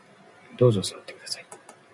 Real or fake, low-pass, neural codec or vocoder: real; 10.8 kHz; none